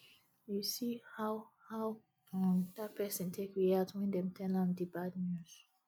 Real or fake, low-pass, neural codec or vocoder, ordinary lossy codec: real; none; none; none